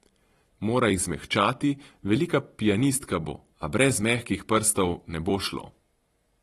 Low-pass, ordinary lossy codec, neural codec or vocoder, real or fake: 19.8 kHz; AAC, 32 kbps; vocoder, 44.1 kHz, 128 mel bands every 256 samples, BigVGAN v2; fake